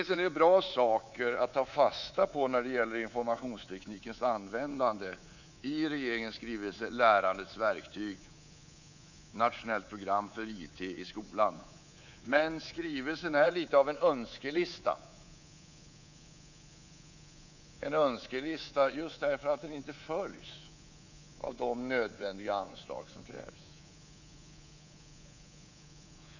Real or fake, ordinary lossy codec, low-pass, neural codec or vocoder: fake; none; 7.2 kHz; codec, 24 kHz, 3.1 kbps, DualCodec